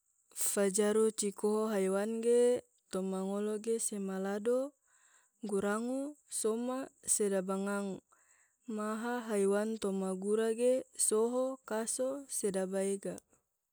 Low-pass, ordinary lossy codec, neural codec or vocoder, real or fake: none; none; none; real